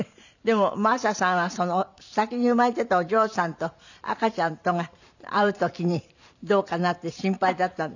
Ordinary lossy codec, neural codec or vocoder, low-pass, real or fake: none; vocoder, 44.1 kHz, 128 mel bands every 512 samples, BigVGAN v2; 7.2 kHz; fake